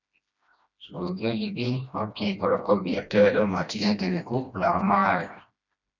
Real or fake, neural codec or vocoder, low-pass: fake; codec, 16 kHz, 1 kbps, FreqCodec, smaller model; 7.2 kHz